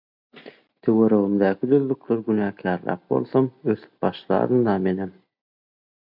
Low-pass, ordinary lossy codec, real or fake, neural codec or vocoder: 5.4 kHz; MP3, 48 kbps; real; none